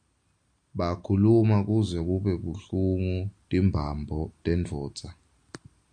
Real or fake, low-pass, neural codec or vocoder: real; 9.9 kHz; none